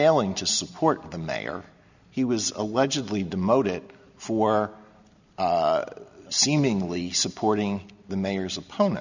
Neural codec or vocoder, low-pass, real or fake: none; 7.2 kHz; real